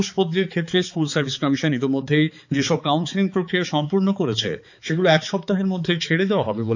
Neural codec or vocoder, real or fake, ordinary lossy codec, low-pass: codec, 16 kHz, 4 kbps, X-Codec, HuBERT features, trained on balanced general audio; fake; none; 7.2 kHz